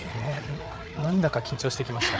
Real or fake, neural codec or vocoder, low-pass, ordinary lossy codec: fake; codec, 16 kHz, 8 kbps, FreqCodec, larger model; none; none